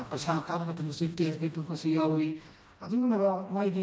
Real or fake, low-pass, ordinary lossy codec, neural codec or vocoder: fake; none; none; codec, 16 kHz, 1 kbps, FreqCodec, smaller model